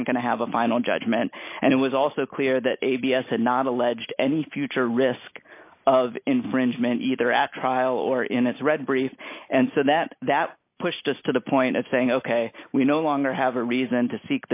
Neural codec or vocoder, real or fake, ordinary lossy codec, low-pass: none; real; AAC, 32 kbps; 3.6 kHz